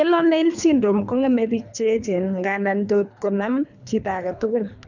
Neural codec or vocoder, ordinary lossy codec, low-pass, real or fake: codec, 24 kHz, 3 kbps, HILCodec; none; 7.2 kHz; fake